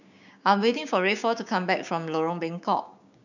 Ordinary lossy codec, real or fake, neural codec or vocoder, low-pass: none; fake; codec, 16 kHz, 6 kbps, DAC; 7.2 kHz